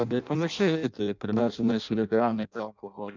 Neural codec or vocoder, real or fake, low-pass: codec, 16 kHz in and 24 kHz out, 0.6 kbps, FireRedTTS-2 codec; fake; 7.2 kHz